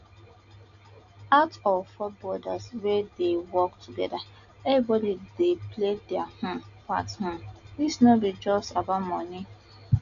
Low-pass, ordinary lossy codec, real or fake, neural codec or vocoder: 7.2 kHz; none; real; none